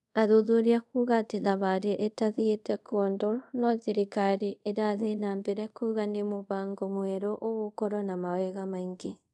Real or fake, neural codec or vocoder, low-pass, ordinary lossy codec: fake; codec, 24 kHz, 0.5 kbps, DualCodec; none; none